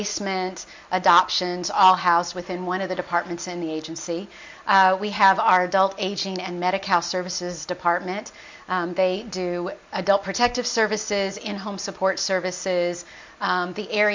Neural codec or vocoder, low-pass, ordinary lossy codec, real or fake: none; 7.2 kHz; MP3, 48 kbps; real